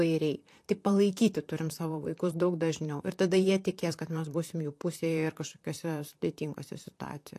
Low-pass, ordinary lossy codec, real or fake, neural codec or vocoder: 14.4 kHz; AAC, 64 kbps; fake; vocoder, 44.1 kHz, 128 mel bands, Pupu-Vocoder